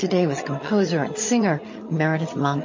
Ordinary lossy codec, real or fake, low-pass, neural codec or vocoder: MP3, 32 kbps; fake; 7.2 kHz; vocoder, 22.05 kHz, 80 mel bands, HiFi-GAN